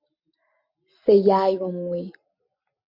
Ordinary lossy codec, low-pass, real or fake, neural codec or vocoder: MP3, 32 kbps; 5.4 kHz; real; none